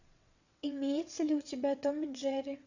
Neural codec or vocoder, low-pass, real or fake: vocoder, 22.05 kHz, 80 mel bands, WaveNeXt; 7.2 kHz; fake